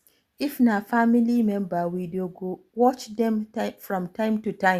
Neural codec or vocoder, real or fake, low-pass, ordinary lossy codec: none; real; 14.4 kHz; Opus, 64 kbps